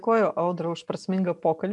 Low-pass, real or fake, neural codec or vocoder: 10.8 kHz; real; none